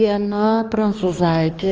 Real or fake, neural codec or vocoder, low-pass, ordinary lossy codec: fake; codec, 16 kHz, 2 kbps, X-Codec, HuBERT features, trained on balanced general audio; 7.2 kHz; Opus, 24 kbps